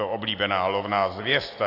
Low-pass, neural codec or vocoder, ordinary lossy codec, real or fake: 5.4 kHz; none; AAC, 32 kbps; real